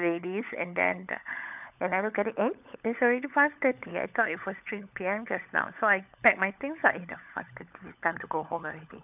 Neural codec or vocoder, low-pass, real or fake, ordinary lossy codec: codec, 16 kHz, 16 kbps, FunCodec, trained on LibriTTS, 50 frames a second; 3.6 kHz; fake; none